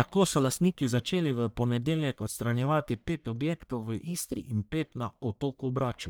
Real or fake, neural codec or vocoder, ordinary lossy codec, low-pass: fake; codec, 44.1 kHz, 1.7 kbps, Pupu-Codec; none; none